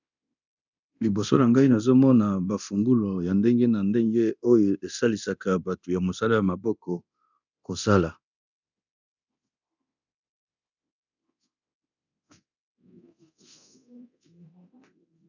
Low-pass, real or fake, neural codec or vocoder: 7.2 kHz; fake; codec, 24 kHz, 0.9 kbps, DualCodec